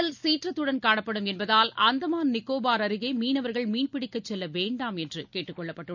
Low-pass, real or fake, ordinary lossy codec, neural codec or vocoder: 7.2 kHz; real; MP3, 64 kbps; none